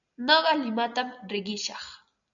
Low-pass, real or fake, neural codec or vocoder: 7.2 kHz; real; none